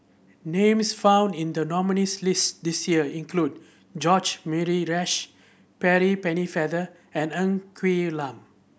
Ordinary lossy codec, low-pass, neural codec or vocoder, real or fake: none; none; none; real